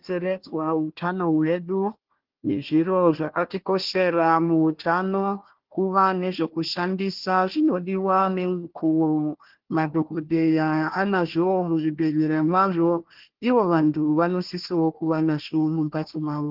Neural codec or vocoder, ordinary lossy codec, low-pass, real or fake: codec, 16 kHz, 1 kbps, FunCodec, trained on Chinese and English, 50 frames a second; Opus, 16 kbps; 5.4 kHz; fake